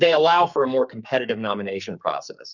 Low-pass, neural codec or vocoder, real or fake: 7.2 kHz; codec, 44.1 kHz, 2.6 kbps, SNAC; fake